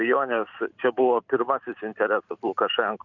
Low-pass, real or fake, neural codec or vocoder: 7.2 kHz; fake; vocoder, 44.1 kHz, 128 mel bands every 512 samples, BigVGAN v2